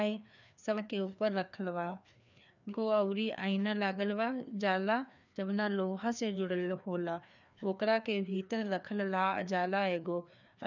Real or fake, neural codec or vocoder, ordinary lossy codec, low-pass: fake; codec, 16 kHz, 2 kbps, FreqCodec, larger model; none; 7.2 kHz